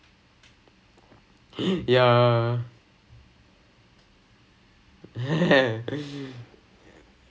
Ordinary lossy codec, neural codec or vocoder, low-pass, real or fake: none; none; none; real